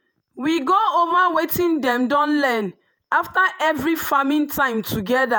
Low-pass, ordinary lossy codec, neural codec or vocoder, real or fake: none; none; vocoder, 48 kHz, 128 mel bands, Vocos; fake